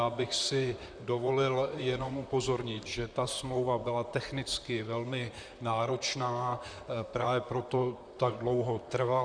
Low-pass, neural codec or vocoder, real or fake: 9.9 kHz; vocoder, 44.1 kHz, 128 mel bands, Pupu-Vocoder; fake